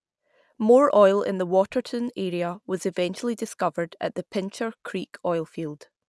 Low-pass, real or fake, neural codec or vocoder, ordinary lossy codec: none; real; none; none